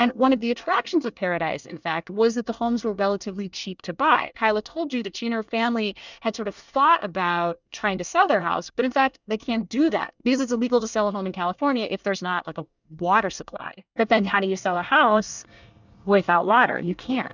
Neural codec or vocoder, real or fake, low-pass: codec, 24 kHz, 1 kbps, SNAC; fake; 7.2 kHz